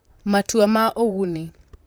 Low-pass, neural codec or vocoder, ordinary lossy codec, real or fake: none; vocoder, 44.1 kHz, 128 mel bands, Pupu-Vocoder; none; fake